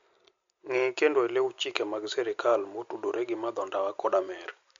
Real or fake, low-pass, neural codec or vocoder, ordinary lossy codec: real; 7.2 kHz; none; MP3, 48 kbps